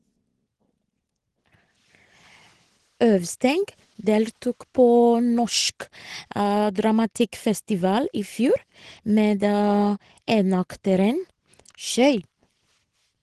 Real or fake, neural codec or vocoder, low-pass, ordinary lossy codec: real; none; 10.8 kHz; Opus, 16 kbps